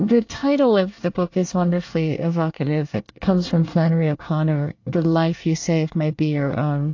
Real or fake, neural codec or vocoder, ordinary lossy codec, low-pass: fake; codec, 24 kHz, 1 kbps, SNAC; AAC, 48 kbps; 7.2 kHz